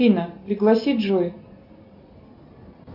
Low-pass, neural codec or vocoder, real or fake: 5.4 kHz; none; real